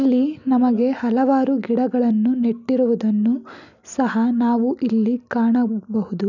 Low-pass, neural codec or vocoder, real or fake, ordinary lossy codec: 7.2 kHz; none; real; none